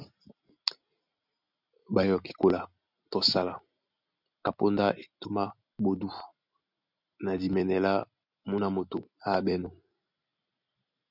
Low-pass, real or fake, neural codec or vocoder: 5.4 kHz; real; none